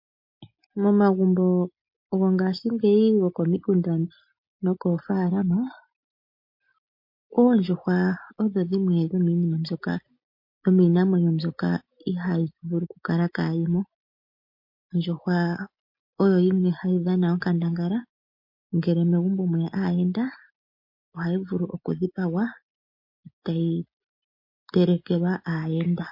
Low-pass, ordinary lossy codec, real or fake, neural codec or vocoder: 5.4 kHz; MP3, 32 kbps; real; none